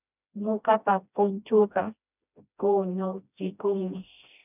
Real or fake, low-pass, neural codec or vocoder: fake; 3.6 kHz; codec, 16 kHz, 1 kbps, FreqCodec, smaller model